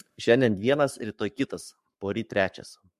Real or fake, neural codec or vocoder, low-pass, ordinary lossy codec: fake; codec, 44.1 kHz, 7.8 kbps, Pupu-Codec; 14.4 kHz; MP3, 64 kbps